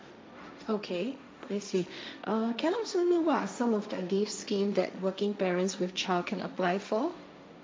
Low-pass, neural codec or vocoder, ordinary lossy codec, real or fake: none; codec, 16 kHz, 1.1 kbps, Voila-Tokenizer; none; fake